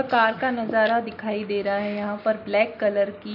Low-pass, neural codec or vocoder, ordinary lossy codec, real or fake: 5.4 kHz; none; AAC, 48 kbps; real